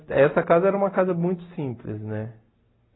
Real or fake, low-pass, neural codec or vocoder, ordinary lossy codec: real; 7.2 kHz; none; AAC, 16 kbps